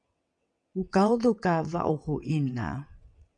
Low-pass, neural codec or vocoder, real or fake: 9.9 kHz; vocoder, 22.05 kHz, 80 mel bands, WaveNeXt; fake